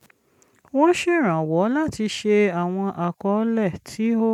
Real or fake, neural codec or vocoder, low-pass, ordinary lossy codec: real; none; 19.8 kHz; none